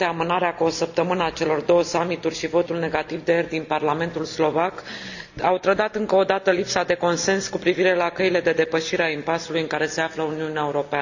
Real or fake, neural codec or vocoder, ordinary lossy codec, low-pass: real; none; none; 7.2 kHz